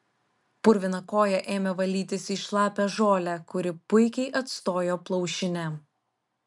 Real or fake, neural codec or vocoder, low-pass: real; none; 10.8 kHz